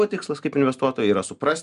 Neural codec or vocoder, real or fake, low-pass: none; real; 10.8 kHz